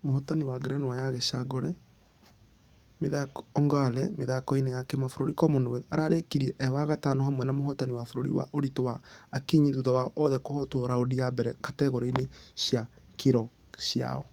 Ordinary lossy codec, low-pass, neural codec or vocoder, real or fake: Opus, 64 kbps; 19.8 kHz; codec, 44.1 kHz, 7.8 kbps, DAC; fake